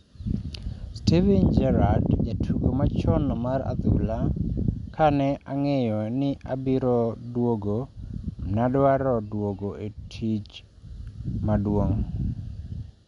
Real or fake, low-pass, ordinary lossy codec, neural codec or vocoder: real; 10.8 kHz; none; none